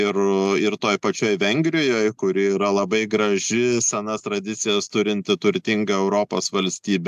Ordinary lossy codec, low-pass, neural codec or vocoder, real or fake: AAC, 96 kbps; 14.4 kHz; none; real